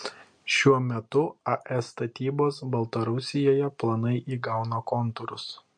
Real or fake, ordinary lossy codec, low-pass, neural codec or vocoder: real; MP3, 48 kbps; 9.9 kHz; none